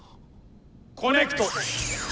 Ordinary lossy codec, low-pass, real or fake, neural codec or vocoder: none; none; real; none